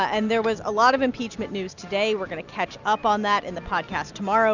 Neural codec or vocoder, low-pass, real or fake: none; 7.2 kHz; real